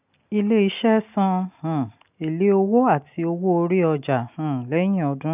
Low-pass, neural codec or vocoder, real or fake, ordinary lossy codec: 3.6 kHz; none; real; none